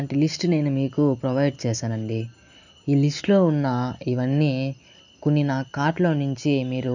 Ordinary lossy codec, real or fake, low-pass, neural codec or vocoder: none; real; 7.2 kHz; none